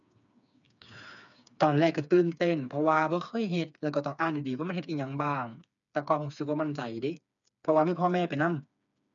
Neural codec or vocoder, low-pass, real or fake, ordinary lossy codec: codec, 16 kHz, 4 kbps, FreqCodec, smaller model; 7.2 kHz; fake; none